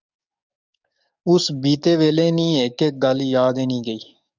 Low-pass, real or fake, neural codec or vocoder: 7.2 kHz; fake; codec, 44.1 kHz, 7.8 kbps, DAC